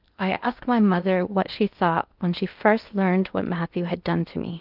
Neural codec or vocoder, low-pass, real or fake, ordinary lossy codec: codec, 16 kHz in and 24 kHz out, 0.8 kbps, FocalCodec, streaming, 65536 codes; 5.4 kHz; fake; Opus, 24 kbps